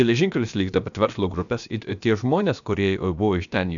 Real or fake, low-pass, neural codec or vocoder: fake; 7.2 kHz; codec, 16 kHz, about 1 kbps, DyCAST, with the encoder's durations